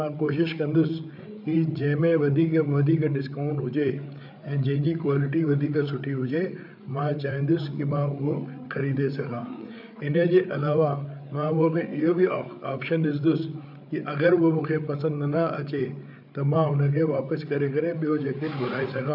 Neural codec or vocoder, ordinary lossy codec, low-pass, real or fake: codec, 16 kHz, 8 kbps, FreqCodec, larger model; none; 5.4 kHz; fake